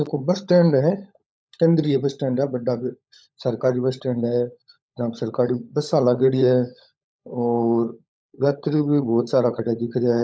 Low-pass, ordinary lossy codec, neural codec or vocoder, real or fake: none; none; codec, 16 kHz, 16 kbps, FunCodec, trained on LibriTTS, 50 frames a second; fake